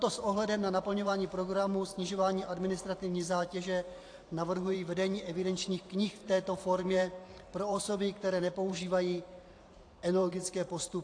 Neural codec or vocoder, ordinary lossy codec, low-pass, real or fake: vocoder, 44.1 kHz, 128 mel bands every 512 samples, BigVGAN v2; AAC, 48 kbps; 9.9 kHz; fake